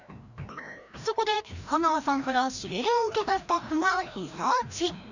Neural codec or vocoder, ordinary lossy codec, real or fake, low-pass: codec, 16 kHz, 1 kbps, FreqCodec, larger model; none; fake; 7.2 kHz